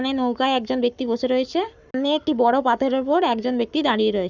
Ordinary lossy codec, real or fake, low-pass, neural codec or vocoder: none; fake; 7.2 kHz; codec, 44.1 kHz, 7.8 kbps, Pupu-Codec